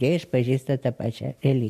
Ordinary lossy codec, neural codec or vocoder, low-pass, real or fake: MP3, 64 kbps; none; 14.4 kHz; real